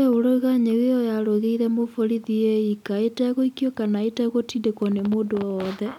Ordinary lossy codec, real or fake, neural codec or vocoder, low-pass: none; real; none; 19.8 kHz